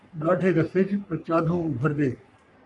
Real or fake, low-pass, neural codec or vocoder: fake; 10.8 kHz; codec, 44.1 kHz, 3.4 kbps, Pupu-Codec